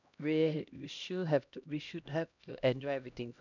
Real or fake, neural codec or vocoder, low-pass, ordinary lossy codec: fake; codec, 16 kHz, 1 kbps, X-Codec, HuBERT features, trained on LibriSpeech; 7.2 kHz; none